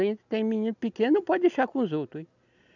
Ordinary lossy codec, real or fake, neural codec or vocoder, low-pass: none; real; none; 7.2 kHz